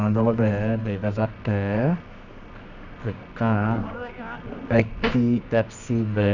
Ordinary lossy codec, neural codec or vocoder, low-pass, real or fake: none; codec, 24 kHz, 0.9 kbps, WavTokenizer, medium music audio release; 7.2 kHz; fake